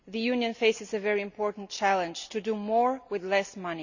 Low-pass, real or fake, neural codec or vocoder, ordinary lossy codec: 7.2 kHz; real; none; none